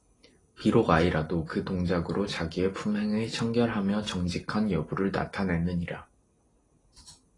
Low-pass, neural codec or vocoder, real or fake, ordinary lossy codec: 10.8 kHz; none; real; AAC, 32 kbps